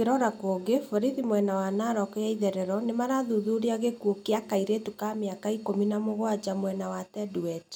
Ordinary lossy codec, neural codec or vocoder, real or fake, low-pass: none; none; real; 19.8 kHz